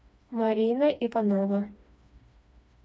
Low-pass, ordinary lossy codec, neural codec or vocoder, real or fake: none; none; codec, 16 kHz, 2 kbps, FreqCodec, smaller model; fake